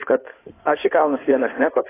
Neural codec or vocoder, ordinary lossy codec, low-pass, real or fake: codec, 16 kHz in and 24 kHz out, 2.2 kbps, FireRedTTS-2 codec; AAC, 16 kbps; 3.6 kHz; fake